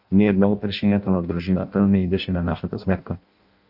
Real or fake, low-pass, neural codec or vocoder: fake; 5.4 kHz; codec, 16 kHz in and 24 kHz out, 0.6 kbps, FireRedTTS-2 codec